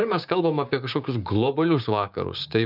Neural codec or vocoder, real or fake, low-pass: codec, 16 kHz, 6 kbps, DAC; fake; 5.4 kHz